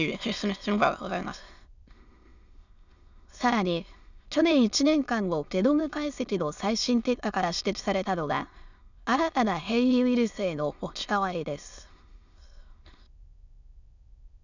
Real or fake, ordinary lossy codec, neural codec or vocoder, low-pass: fake; none; autoencoder, 22.05 kHz, a latent of 192 numbers a frame, VITS, trained on many speakers; 7.2 kHz